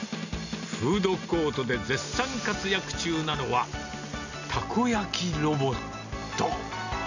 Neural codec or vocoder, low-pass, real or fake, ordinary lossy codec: none; 7.2 kHz; real; none